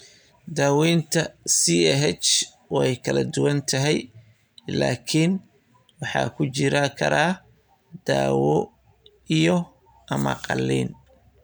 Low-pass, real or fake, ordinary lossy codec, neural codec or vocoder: none; real; none; none